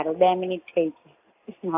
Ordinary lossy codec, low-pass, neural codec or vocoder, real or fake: AAC, 32 kbps; 3.6 kHz; none; real